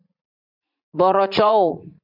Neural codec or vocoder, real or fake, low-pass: vocoder, 44.1 kHz, 80 mel bands, Vocos; fake; 5.4 kHz